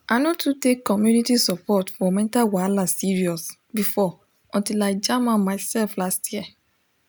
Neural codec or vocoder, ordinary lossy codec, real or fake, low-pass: none; none; real; none